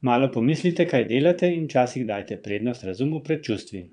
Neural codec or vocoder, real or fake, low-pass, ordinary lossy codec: vocoder, 22.05 kHz, 80 mel bands, Vocos; fake; 9.9 kHz; none